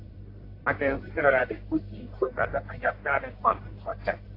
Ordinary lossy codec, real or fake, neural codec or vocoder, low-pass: AAC, 32 kbps; fake; codec, 44.1 kHz, 1.7 kbps, Pupu-Codec; 5.4 kHz